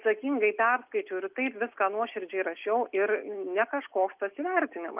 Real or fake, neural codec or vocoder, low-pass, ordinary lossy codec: real; none; 3.6 kHz; Opus, 24 kbps